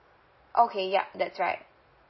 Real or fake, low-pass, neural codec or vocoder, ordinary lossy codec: real; 7.2 kHz; none; MP3, 24 kbps